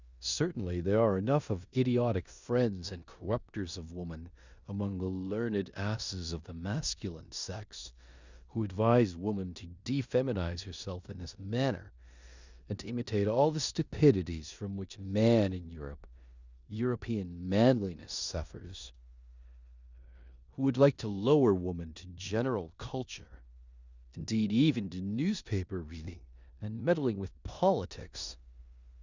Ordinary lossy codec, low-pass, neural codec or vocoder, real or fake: Opus, 64 kbps; 7.2 kHz; codec, 16 kHz in and 24 kHz out, 0.9 kbps, LongCat-Audio-Codec, four codebook decoder; fake